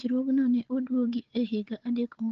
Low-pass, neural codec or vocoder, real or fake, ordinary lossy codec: 7.2 kHz; codec, 16 kHz, 4 kbps, FunCodec, trained on Chinese and English, 50 frames a second; fake; Opus, 16 kbps